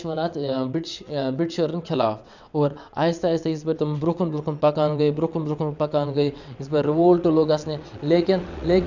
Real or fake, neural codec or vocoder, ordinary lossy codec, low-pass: fake; vocoder, 22.05 kHz, 80 mel bands, WaveNeXt; none; 7.2 kHz